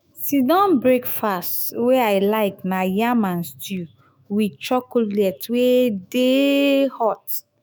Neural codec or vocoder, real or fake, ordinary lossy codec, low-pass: autoencoder, 48 kHz, 128 numbers a frame, DAC-VAE, trained on Japanese speech; fake; none; none